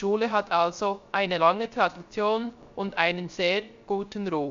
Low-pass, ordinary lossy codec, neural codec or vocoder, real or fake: 7.2 kHz; Opus, 64 kbps; codec, 16 kHz, 0.3 kbps, FocalCodec; fake